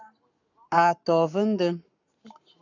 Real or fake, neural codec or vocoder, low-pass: fake; codec, 44.1 kHz, 7.8 kbps, Pupu-Codec; 7.2 kHz